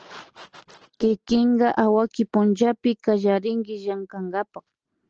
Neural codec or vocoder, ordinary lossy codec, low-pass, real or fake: none; Opus, 16 kbps; 7.2 kHz; real